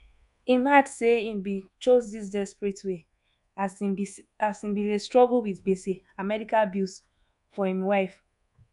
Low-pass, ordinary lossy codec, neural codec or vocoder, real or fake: 10.8 kHz; none; codec, 24 kHz, 1.2 kbps, DualCodec; fake